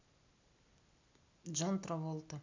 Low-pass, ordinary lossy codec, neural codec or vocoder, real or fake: 7.2 kHz; MP3, 64 kbps; none; real